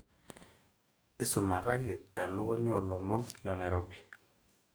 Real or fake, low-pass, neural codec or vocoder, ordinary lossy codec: fake; none; codec, 44.1 kHz, 2.6 kbps, DAC; none